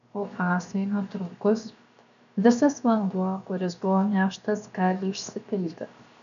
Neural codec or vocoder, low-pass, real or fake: codec, 16 kHz, 0.7 kbps, FocalCodec; 7.2 kHz; fake